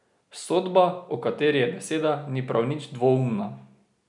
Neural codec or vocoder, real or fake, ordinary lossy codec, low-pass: none; real; none; 10.8 kHz